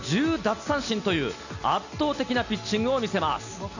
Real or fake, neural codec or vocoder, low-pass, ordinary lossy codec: real; none; 7.2 kHz; none